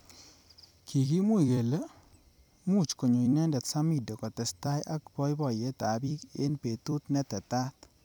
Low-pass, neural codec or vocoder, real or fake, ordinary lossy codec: none; vocoder, 44.1 kHz, 128 mel bands every 256 samples, BigVGAN v2; fake; none